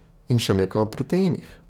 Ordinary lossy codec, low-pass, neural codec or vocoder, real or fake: none; 19.8 kHz; codec, 44.1 kHz, 2.6 kbps, DAC; fake